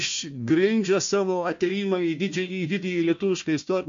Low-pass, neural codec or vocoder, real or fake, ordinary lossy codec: 7.2 kHz; codec, 16 kHz, 1 kbps, FunCodec, trained on Chinese and English, 50 frames a second; fake; MP3, 48 kbps